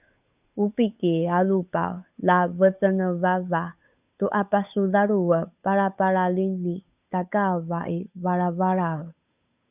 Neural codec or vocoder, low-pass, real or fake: codec, 16 kHz, 8 kbps, FunCodec, trained on Chinese and English, 25 frames a second; 3.6 kHz; fake